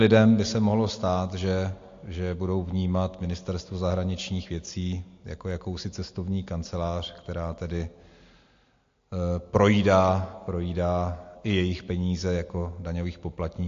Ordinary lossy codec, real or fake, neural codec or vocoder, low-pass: AAC, 48 kbps; real; none; 7.2 kHz